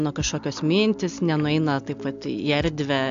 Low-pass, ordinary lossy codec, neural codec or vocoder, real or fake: 7.2 kHz; AAC, 64 kbps; none; real